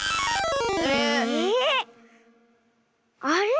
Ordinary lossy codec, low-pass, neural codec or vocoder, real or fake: none; none; none; real